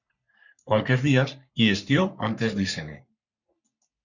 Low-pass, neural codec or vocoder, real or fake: 7.2 kHz; codec, 44.1 kHz, 3.4 kbps, Pupu-Codec; fake